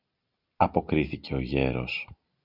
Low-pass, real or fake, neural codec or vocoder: 5.4 kHz; real; none